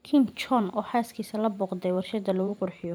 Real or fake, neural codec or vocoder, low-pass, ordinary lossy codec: fake; vocoder, 44.1 kHz, 128 mel bands every 512 samples, BigVGAN v2; none; none